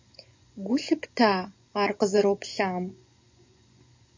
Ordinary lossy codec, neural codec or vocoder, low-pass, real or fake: MP3, 48 kbps; vocoder, 24 kHz, 100 mel bands, Vocos; 7.2 kHz; fake